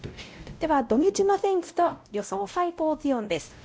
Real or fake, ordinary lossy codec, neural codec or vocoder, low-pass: fake; none; codec, 16 kHz, 0.5 kbps, X-Codec, WavLM features, trained on Multilingual LibriSpeech; none